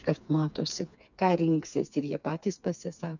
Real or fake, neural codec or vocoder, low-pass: fake; codec, 16 kHz, 4 kbps, FreqCodec, smaller model; 7.2 kHz